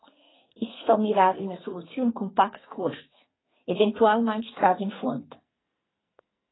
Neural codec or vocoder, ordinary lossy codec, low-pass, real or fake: codec, 44.1 kHz, 2.6 kbps, SNAC; AAC, 16 kbps; 7.2 kHz; fake